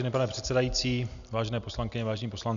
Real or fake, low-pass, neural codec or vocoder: real; 7.2 kHz; none